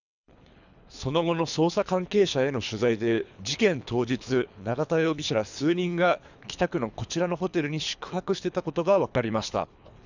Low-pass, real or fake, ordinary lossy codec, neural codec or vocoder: 7.2 kHz; fake; none; codec, 24 kHz, 3 kbps, HILCodec